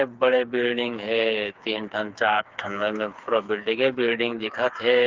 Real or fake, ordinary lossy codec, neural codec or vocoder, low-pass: fake; Opus, 32 kbps; codec, 16 kHz, 4 kbps, FreqCodec, smaller model; 7.2 kHz